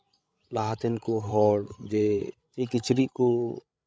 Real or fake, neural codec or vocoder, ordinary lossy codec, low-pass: fake; codec, 16 kHz, 8 kbps, FreqCodec, larger model; none; none